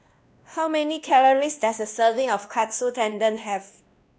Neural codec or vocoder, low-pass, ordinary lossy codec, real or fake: codec, 16 kHz, 1 kbps, X-Codec, WavLM features, trained on Multilingual LibriSpeech; none; none; fake